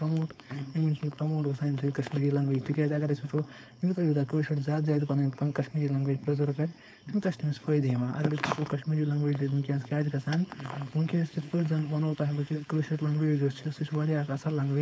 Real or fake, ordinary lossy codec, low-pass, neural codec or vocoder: fake; none; none; codec, 16 kHz, 4.8 kbps, FACodec